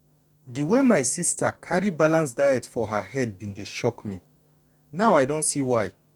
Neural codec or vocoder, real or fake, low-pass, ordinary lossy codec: codec, 44.1 kHz, 2.6 kbps, DAC; fake; 19.8 kHz; none